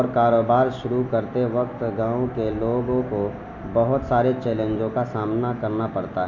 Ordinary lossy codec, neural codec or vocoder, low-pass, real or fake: none; none; 7.2 kHz; real